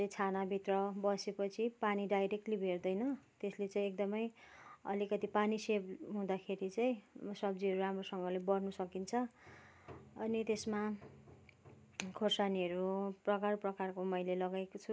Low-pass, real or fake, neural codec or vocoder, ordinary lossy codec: none; real; none; none